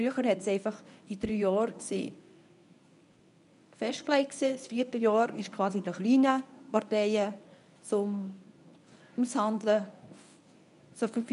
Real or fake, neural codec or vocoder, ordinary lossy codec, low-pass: fake; codec, 24 kHz, 0.9 kbps, WavTokenizer, medium speech release version 1; none; 10.8 kHz